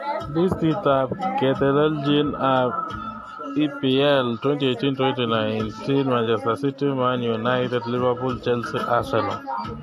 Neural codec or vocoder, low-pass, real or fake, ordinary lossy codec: none; 14.4 kHz; real; AAC, 64 kbps